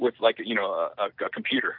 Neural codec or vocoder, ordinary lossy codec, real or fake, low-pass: none; AAC, 48 kbps; real; 5.4 kHz